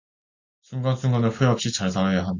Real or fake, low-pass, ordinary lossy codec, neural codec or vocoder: real; 7.2 kHz; AAC, 48 kbps; none